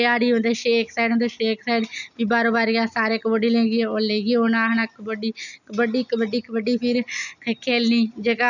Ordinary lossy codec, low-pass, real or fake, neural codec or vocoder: none; 7.2 kHz; real; none